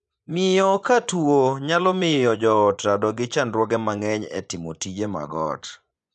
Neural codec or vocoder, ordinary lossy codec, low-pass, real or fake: vocoder, 24 kHz, 100 mel bands, Vocos; none; none; fake